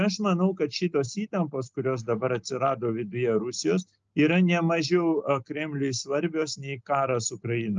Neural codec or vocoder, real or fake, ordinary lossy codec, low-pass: none; real; Opus, 24 kbps; 7.2 kHz